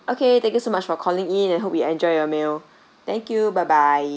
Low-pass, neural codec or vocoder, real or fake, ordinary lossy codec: none; none; real; none